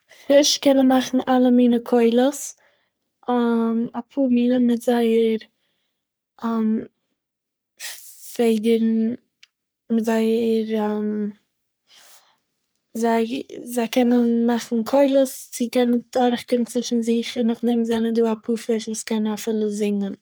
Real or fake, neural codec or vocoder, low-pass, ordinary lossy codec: fake; codec, 44.1 kHz, 3.4 kbps, Pupu-Codec; none; none